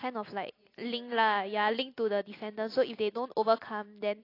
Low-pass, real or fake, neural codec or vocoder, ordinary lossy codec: 5.4 kHz; real; none; AAC, 32 kbps